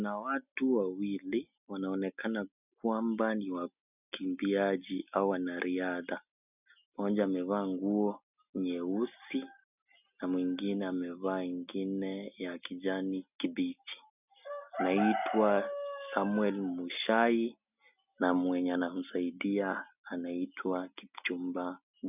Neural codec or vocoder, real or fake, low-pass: none; real; 3.6 kHz